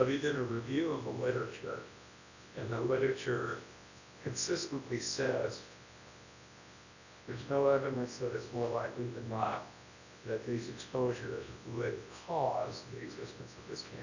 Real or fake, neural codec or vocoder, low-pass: fake; codec, 24 kHz, 0.9 kbps, WavTokenizer, large speech release; 7.2 kHz